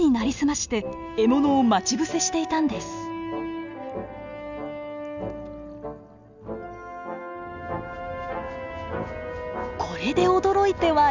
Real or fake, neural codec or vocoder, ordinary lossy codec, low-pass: real; none; none; 7.2 kHz